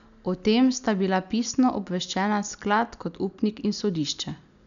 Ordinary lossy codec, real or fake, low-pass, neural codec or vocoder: none; real; 7.2 kHz; none